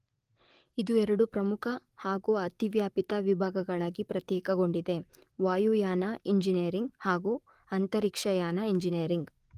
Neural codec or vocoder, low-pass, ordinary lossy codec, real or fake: codec, 44.1 kHz, 7.8 kbps, DAC; 14.4 kHz; Opus, 24 kbps; fake